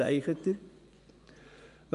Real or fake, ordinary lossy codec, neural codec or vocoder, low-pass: fake; none; vocoder, 24 kHz, 100 mel bands, Vocos; 10.8 kHz